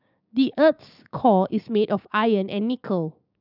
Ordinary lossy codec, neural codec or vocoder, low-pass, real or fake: none; codec, 16 kHz, 6 kbps, DAC; 5.4 kHz; fake